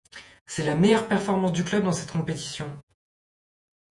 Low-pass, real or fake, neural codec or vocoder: 10.8 kHz; fake; vocoder, 48 kHz, 128 mel bands, Vocos